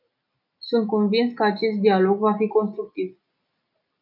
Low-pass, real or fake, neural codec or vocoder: 5.4 kHz; real; none